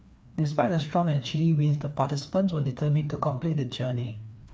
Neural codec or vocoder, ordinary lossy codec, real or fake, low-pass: codec, 16 kHz, 2 kbps, FreqCodec, larger model; none; fake; none